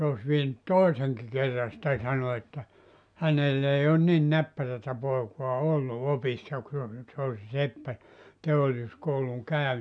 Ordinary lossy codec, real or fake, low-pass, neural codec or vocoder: none; real; 9.9 kHz; none